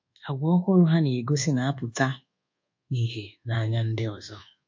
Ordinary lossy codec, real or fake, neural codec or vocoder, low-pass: MP3, 48 kbps; fake; codec, 24 kHz, 1.2 kbps, DualCodec; 7.2 kHz